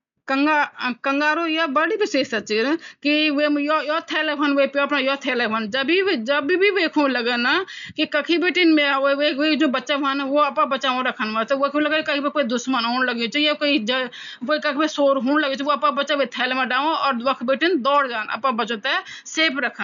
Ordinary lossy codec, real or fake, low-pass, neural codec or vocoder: none; real; 7.2 kHz; none